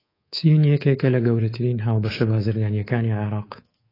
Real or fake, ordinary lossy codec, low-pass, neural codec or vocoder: fake; AAC, 24 kbps; 5.4 kHz; codec, 24 kHz, 3.1 kbps, DualCodec